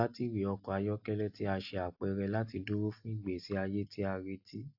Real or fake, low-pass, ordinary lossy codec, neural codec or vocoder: real; 5.4 kHz; AAC, 48 kbps; none